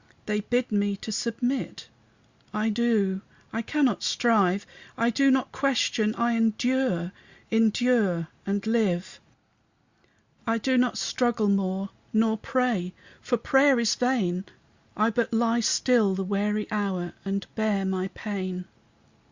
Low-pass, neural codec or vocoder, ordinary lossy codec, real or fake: 7.2 kHz; none; Opus, 64 kbps; real